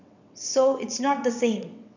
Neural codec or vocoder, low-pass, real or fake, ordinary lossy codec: vocoder, 22.05 kHz, 80 mel bands, WaveNeXt; 7.2 kHz; fake; none